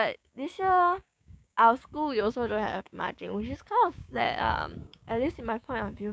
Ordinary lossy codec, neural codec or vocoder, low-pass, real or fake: none; codec, 16 kHz, 6 kbps, DAC; none; fake